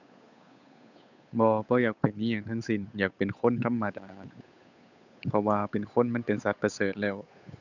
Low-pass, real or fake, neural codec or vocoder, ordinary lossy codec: 7.2 kHz; fake; codec, 16 kHz, 8 kbps, FunCodec, trained on Chinese and English, 25 frames a second; none